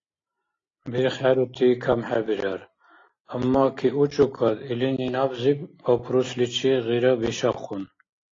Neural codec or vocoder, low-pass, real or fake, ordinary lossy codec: none; 7.2 kHz; real; AAC, 32 kbps